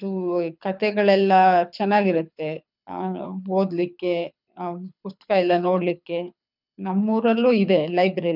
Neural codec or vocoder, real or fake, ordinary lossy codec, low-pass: codec, 24 kHz, 6 kbps, HILCodec; fake; none; 5.4 kHz